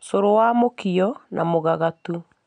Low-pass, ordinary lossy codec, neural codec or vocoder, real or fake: 9.9 kHz; none; none; real